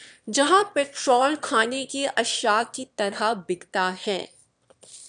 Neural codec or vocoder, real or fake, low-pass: autoencoder, 22.05 kHz, a latent of 192 numbers a frame, VITS, trained on one speaker; fake; 9.9 kHz